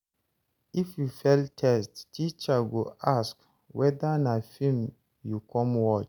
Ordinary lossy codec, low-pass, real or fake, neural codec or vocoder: none; 19.8 kHz; real; none